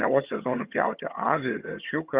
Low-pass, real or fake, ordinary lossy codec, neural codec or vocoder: 3.6 kHz; fake; Opus, 64 kbps; vocoder, 22.05 kHz, 80 mel bands, HiFi-GAN